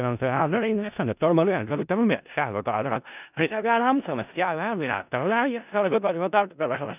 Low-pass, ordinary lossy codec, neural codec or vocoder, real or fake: 3.6 kHz; none; codec, 16 kHz in and 24 kHz out, 0.4 kbps, LongCat-Audio-Codec, four codebook decoder; fake